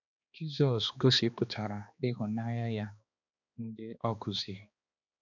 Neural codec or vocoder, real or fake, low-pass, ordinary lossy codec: codec, 16 kHz, 2 kbps, X-Codec, HuBERT features, trained on balanced general audio; fake; 7.2 kHz; none